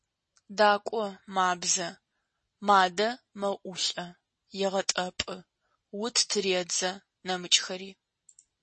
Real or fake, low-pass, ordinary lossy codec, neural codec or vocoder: real; 10.8 kHz; MP3, 32 kbps; none